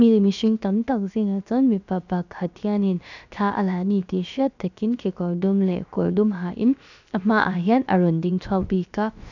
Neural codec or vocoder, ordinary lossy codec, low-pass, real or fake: codec, 16 kHz, about 1 kbps, DyCAST, with the encoder's durations; none; 7.2 kHz; fake